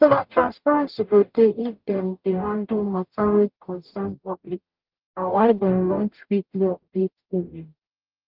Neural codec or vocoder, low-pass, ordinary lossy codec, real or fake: codec, 44.1 kHz, 0.9 kbps, DAC; 5.4 kHz; Opus, 32 kbps; fake